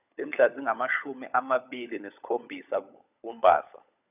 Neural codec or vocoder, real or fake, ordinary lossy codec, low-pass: codec, 16 kHz, 16 kbps, FunCodec, trained on LibriTTS, 50 frames a second; fake; none; 3.6 kHz